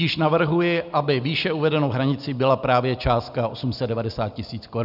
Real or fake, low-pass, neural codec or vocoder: real; 5.4 kHz; none